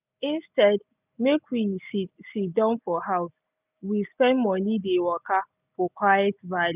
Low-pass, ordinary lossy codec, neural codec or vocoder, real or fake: 3.6 kHz; none; none; real